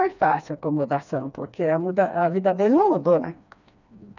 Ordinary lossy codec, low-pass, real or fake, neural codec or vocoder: none; 7.2 kHz; fake; codec, 16 kHz, 2 kbps, FreqCodec, smaller model